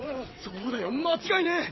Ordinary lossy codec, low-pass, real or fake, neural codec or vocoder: MP3, 24 kbps; 7.2 kHz; fake; vocoder, 22.05 kHz, 80 mel bands, WaveNeXt